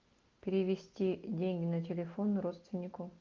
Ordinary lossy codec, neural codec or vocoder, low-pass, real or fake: Opus, 32 kbps; none; 7.2 kHz; real